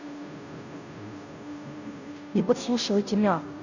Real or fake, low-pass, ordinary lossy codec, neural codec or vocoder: fake; 7.2 kHz; none; codec, 16 kHz, 0.5 kbps, FunCodec, trained on Chinese and English, 25 frames a second